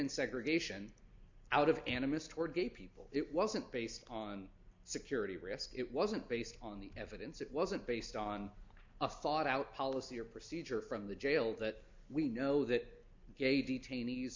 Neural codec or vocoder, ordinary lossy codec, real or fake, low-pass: none; MP3, 64 kbps; real; 7.2 kHz